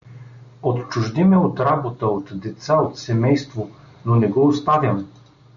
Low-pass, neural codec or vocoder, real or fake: 7.2 kHz; none; real